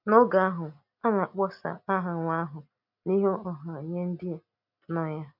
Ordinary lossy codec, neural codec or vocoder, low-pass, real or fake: none; none; 5.4 kHz; real